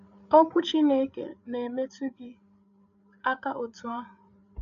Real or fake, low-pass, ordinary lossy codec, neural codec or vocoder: fake; 7.2 kHz; MP3, 96 kbps; codec, 16 kHz, 8 kbps, FreqCodec, larger model